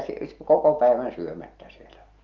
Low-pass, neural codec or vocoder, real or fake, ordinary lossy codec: 7.2 kHz; none; real; Opus, 32 kbps